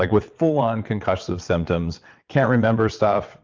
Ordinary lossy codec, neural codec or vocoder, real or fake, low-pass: Opus, 32 kbps; vocoder, 22.05 kHz, 80 mel bands, WaveNeXt; fake; 7.2 kHz